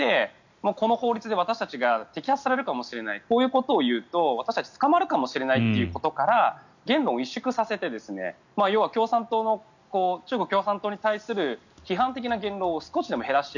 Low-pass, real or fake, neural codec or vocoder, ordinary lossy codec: 7.2 kHz; real; none; none